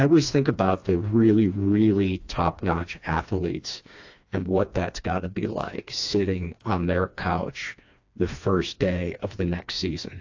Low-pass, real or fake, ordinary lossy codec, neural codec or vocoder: 7.2 kHz; fake; AAC, 48 kbps; codec, 16 kHz, 2 kbps, FreqCodec, smaller model